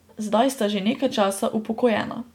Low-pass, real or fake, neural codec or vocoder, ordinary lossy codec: 19.8 kHz; real; none; MP3, 96 kbps